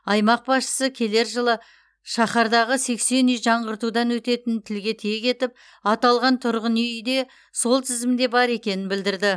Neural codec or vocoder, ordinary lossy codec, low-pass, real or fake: none; none; none; real